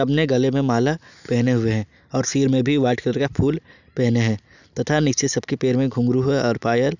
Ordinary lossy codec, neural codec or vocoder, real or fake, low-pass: none; none; real; 7.2 kHz